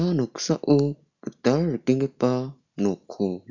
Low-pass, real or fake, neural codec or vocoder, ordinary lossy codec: 7.2 kHz; real; none; none